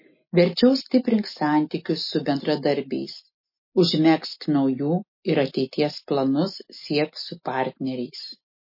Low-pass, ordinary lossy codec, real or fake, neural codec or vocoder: 5.4 kHz; MP3, 24 kbps; real; none